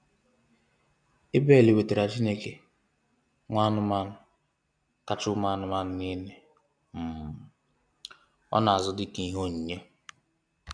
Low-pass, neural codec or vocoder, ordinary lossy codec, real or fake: 9.9 kHz; none; none; real